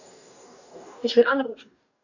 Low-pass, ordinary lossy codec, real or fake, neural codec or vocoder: 7.2 kHz; none; fake; codec, 44.1 kHz, 2.6 kbps, DAC